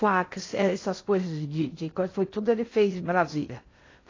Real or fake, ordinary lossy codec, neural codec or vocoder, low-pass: fake; AAC, 32 kbps; codec, 16 kHz in and 24 kHz out, 0.6 kbps, FocalCodec, streaming, 2048 codes; 7.2 kHz